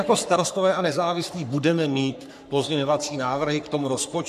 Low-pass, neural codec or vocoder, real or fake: 14.4 kHz; codec, 44.1 kHz, 3.4 kbps, Pupu-Codec; fake